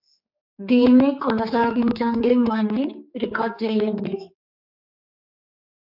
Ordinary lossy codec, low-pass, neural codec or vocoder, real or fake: MP3, 48 kbps; 5.4 kHz; codec, 16 kHz, 4 kbps, X-Codec, HuBERT features, trained on general audio; fake